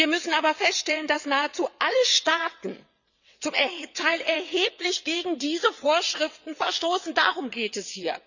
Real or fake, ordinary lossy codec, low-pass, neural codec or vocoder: fake; none; 7.2 kHz; vocoder, 22.05 kHz, 80 mel bands, WaveNeXt